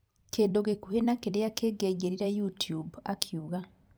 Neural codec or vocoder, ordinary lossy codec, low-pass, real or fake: vocoder, 44.1 kHz, 128 mel bands every 256 samples, BigVGAN v2; none; none; fake